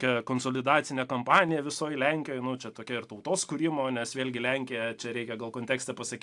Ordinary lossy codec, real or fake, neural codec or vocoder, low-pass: AAC, 64 kbps; real; none; 10.8 kHz